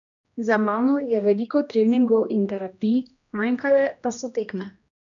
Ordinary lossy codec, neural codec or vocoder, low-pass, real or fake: none; codec, 16 kHz, 1 kbps, X-Codec, HuBERT features, trained on general audio; 7.2 kHz; fake